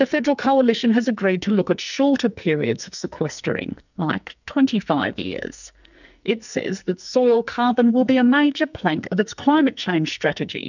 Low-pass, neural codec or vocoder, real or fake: 7.2 kHz; codec, 44.1 kHz, 2.6 kbps, SNAC; fake